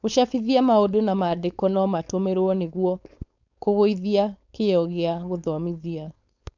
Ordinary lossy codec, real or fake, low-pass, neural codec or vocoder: none; fake; 7.2 kHz; codec, 16 kHz, 4.8 kbps, FACodec